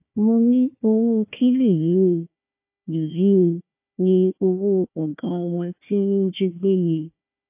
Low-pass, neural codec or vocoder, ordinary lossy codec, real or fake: 3.6 kHz; codec, 16 kHz, 1 kbps, FunCodec, trained on Chinese and English, 50 frames a second; AAC, 32 kbps; fake